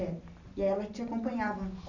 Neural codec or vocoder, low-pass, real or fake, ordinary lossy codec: none; 7.2 kHz; real; AAC, 48 kbps